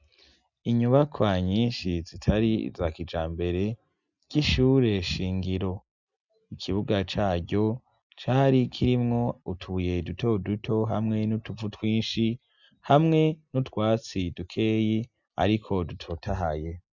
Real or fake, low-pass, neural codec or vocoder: real; 7.2 kHz; none